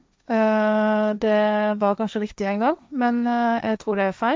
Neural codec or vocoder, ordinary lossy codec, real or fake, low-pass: codec, 16 kHz, 1.1 kbps, Voila-Tokenizer; none; fake; none